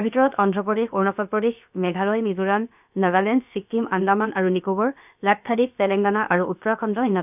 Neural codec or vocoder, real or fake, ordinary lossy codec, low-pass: codec, 16 kHz, about 1 kbps, DyCAST, with the encoder's durations; fake; none; 3.6 kHz